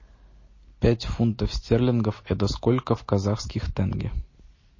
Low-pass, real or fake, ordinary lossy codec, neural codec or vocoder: 7.2 kHz; real; MP3, 32 kbps; none